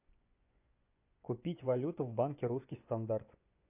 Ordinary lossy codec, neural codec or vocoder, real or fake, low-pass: AAC, 32 kbps; vocoder, 24 kHz, 100 mel bands, Vocos; fake; 3.6 kHz